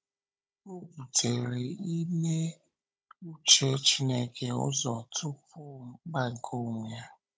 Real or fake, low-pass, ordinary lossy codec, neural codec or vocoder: fake; none; none; codec, 16 kHz, 16 kbps, FunCodec, trained on Chinese and English, 50 frames a second